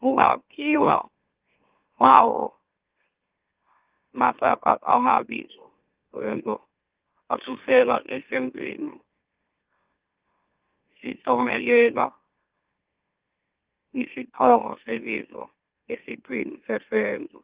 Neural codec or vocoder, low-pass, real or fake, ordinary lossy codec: autoencoder, 44.1 kHz, a latent of 192 numbers a frame, MeloTTS; 3.6 kHz; fake; Opus, 32 kbps